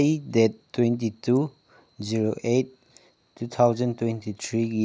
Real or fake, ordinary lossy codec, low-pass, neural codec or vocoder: real; none; none; none